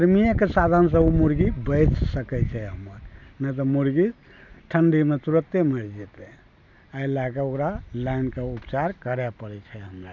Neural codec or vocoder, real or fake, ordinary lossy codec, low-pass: none; real; none; 7.2 kHz